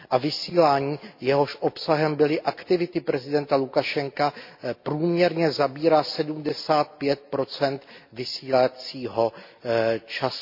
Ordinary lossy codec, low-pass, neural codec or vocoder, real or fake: MP3, 32 kbps; 5.4 kHz; none; real